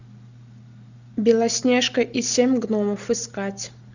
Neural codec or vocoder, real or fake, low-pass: none; real; 7.2 kHz